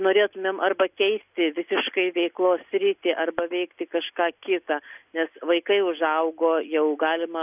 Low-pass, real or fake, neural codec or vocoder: 3.6 kHz; real; none